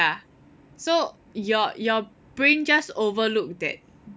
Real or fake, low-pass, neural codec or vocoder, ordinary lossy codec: real; none; none; none